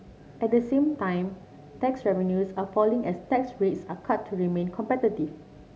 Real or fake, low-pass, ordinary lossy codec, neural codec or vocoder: real; none; none; none